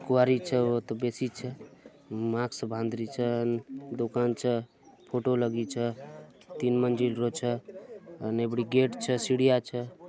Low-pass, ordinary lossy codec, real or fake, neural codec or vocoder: none; none; real; none